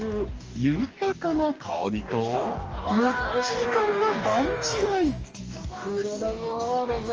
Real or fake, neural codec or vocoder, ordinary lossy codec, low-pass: fake; codec, 44.1 kHz, 2.6 kbps, DAC; Opus, 24 kbps; 7.2 kHz